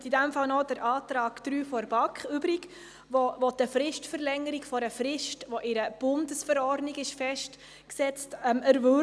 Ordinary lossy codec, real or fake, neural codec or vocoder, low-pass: none; real; none; none